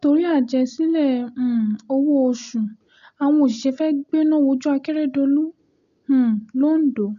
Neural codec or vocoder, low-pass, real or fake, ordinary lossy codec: none; 7.2 kHz; real; AAC, 96 kbps